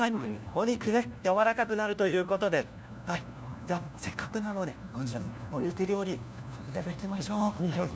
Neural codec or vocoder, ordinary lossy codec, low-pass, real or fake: codec, 16 kHz, 1 kbps, FunCodec, trained on LibriTTS, 50 frames a second; none; none; fake